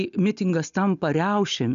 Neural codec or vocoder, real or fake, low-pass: none; real; 7.2 kHz